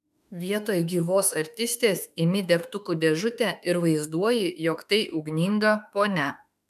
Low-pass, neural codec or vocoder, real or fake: 14.4 kHz; autoencoder, 48 kHz, 32 numbers a frame, DAC-VAE, trained on Japanese speech; fake